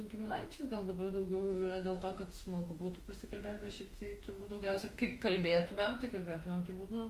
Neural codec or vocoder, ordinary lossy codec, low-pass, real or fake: autoencoder, 48 kHz, 32 numbers a frame, DAC-VAE, trained on Japanese speech; Opus, 32 kbps; 14.4 kHz; fake